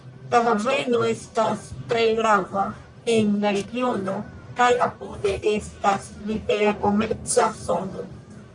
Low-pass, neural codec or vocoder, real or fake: 10.8 kHz; codec, 44.1 kHz, 1.7 kbps, Pupu-Codec; fake